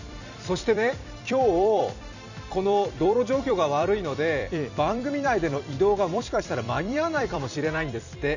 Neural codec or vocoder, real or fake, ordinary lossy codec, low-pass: vocoder, 44.1 kHz, 128 mel bands every 256 samples, BigVGAN v2; fake; none; 7.2 kHz